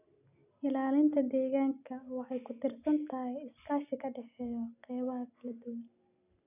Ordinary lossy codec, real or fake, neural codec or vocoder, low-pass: none; real; none; 3.6 kHz